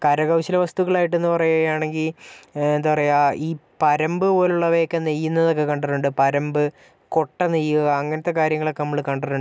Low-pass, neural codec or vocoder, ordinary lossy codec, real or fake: none; none; none; real